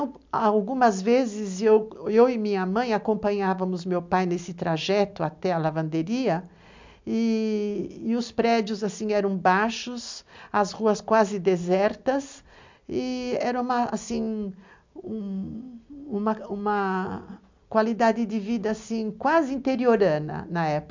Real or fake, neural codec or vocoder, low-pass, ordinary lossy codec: real; none; 7.2 kHz; MP3, 64 kbps